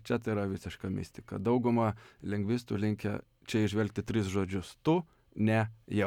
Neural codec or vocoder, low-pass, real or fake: none; 19.8 kHz; real